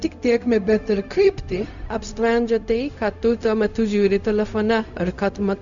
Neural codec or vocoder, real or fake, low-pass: codec, 16 kHz, 0.4 kbps, LongCat-Audio-Codec; fake; 7.2 kHz